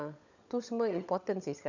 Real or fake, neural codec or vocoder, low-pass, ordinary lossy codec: fake; codec, 16 kHz, 16 kbps, FunCodec, trained on LibriTTS, 50 frames a second; 7.2 kHz; none